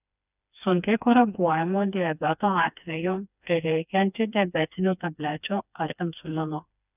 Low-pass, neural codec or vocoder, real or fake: 3.6 kHz; codec, 16 kHz, 2 kbps, FreqCodec, smaller model; fake